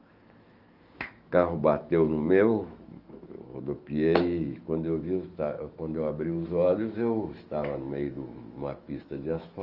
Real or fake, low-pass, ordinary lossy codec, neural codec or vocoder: fake; 5.4 kHz; Opus, 24 kbps; autoencoder, 48 kHz, 128 numbers a frame, DAC-VAE, trained on Japanese speech